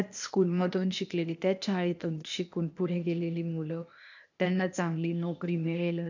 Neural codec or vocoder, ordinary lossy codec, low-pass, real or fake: codec, 16 kHz, 0.8 kbps, ZipCodec; none; 7.2 kHz; fake